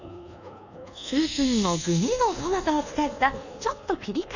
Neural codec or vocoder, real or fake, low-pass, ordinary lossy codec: codec, 24 kHz, 1.2 kbps, DualCodec; fake; 7.2 kHz; none